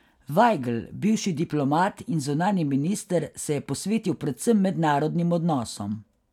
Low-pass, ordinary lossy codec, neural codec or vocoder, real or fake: 19.8 kHz; none; none; real